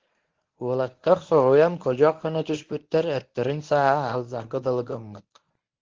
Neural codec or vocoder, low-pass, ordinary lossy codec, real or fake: codec, 24 kHz, 0.9 kbps, WavTokenizer, medium speech release version 1; 7.2 kHz; Opus, 16 kbps; fake